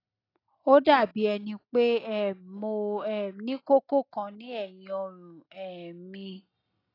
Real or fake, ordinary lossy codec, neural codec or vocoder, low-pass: real; AAC, 24 kbps; none; 5.4 kHz